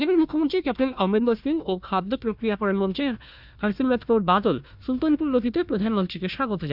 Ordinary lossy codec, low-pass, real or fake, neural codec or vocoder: none; 5.4 kHz; fake; codec, 16 kHz, 1 kbps, FunCodec, trained on Chinese and English, 50 frames a second